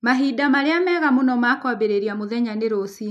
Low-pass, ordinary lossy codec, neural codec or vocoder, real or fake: 14.4 kHz; none; none; real